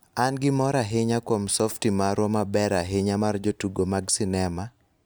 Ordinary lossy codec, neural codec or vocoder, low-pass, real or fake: none; none; none; real